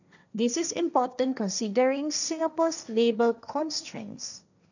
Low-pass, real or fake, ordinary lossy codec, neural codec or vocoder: 7.2 kHz; fake; none; codec, 16 kHz, 1.1 kbps, Voila-Tokenizer